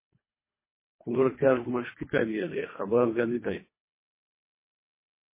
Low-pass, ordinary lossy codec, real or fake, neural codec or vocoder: 3.6 kHz; MP3, 16 kbps; fake; codec, 24 kHz, 1.5 kbps, HILCodec